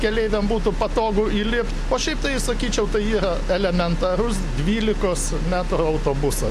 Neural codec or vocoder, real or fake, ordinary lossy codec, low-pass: none; real; AAC, 64 kbps; 14.4 kHz